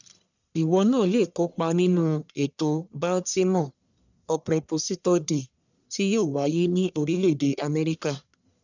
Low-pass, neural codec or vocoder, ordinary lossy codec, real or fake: 7.2 kHz; codec, 44.1 kHz, 1.7 kbps, Pupu-Codec; none; fake